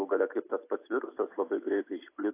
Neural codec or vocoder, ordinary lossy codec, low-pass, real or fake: none; AAC, 24 kbps; 3.6 kHz; real